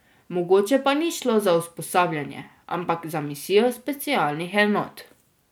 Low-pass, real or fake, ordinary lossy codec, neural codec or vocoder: none; real; none; none